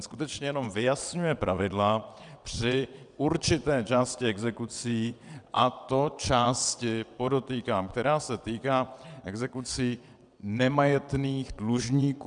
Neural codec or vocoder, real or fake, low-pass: vocoder, 22.05 kHz, 80 mel bands, WaveNeXt; fake; 9.9 kHz